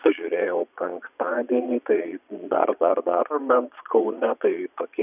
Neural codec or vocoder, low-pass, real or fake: vocoder, 22.05 kHz, 80 mel bands, Vocos; 3.6 kHz; fake